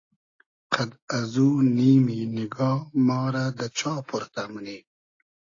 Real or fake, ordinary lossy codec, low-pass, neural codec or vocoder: real; AAC, 32 kbps; 7.2 kHz; none